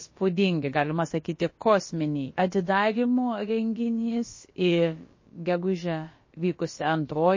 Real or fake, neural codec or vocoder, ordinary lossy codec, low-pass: fake; codec, 16 kHz, about 1 kbps, DyCAST, with the encoder's durations; MP3, 32 kbps; 7.2 kHz